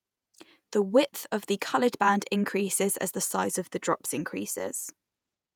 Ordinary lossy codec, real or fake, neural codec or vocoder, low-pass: none; fake; vocoder, 48 kHz, 128 mel bands, Vocos; none